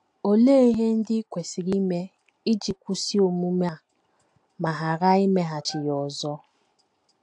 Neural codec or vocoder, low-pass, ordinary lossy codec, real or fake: none; 9.9 kHz; AAC, 64 kbps; real